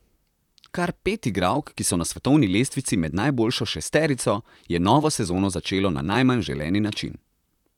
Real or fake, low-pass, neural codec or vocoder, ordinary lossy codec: fake; 19.8 kHz; vocoder, 44.1 kHz, 128 mel bands, Pupu-Vocoder; none